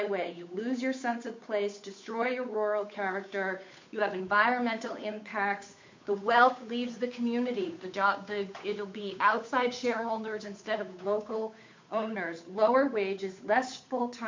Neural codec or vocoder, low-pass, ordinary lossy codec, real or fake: codec, 16 kHz, 8 kbps, FunCodec, trained on Chinese and English, 25 frames a second; 7.2 kHz; MP3, 48 kbps; fake